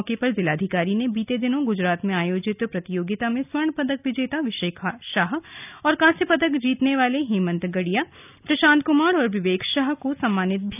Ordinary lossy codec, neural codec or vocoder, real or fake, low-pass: none; none; real; 3.6 kHz